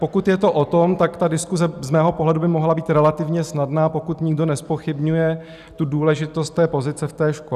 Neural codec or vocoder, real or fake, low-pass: none; real; 14.4 kHz